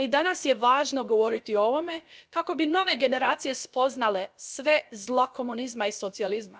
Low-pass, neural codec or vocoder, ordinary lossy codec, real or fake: none; codec, 16 kHz, about 1 kbps, DyCAST, with the encoder's durations; none; fake